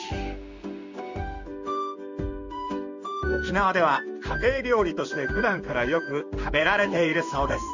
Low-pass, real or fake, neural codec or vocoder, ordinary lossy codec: 7.2 kHz; fake; codec, 16 kHz in and 24 kHz out, 1 kbps, XY-Tokenizer; none